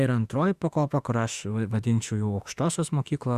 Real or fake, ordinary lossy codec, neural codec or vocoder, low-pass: fake; Opus, 64 kbps; autoencoder, 48 kHz, 32 numbers a frame, DAC-VAE, trained on Japanese speech; 14.4 kHz